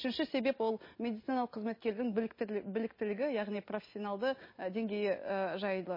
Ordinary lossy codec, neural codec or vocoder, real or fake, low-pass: MP3, 24 kbps; none; real; 5.4 kHz